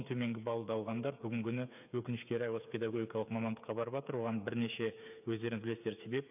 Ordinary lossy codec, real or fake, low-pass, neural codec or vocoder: none; fake; 3.6 kHz; codec, 16 kHz, 8 kbps, FreqCodec, smaller model